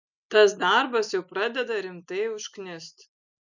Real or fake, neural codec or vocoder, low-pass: real; none; 7.2 kHz